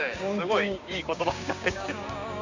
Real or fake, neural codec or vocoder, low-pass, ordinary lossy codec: fake; codec, 16 kHz, 6 kbps, DAC; 7.2 kHz; Opus, 64 kbps